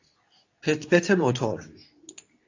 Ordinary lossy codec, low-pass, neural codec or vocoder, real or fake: AAC, 48 kbps; 7.2 kHz; codec, 24 kHz, 0.9 kbps, WavTokenizer, medium speech release version 2; fake